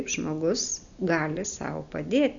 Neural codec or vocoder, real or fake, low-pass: none; real; 7.2 kHz